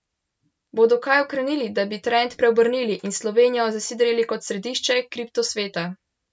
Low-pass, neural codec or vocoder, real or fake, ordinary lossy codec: none; none; real; none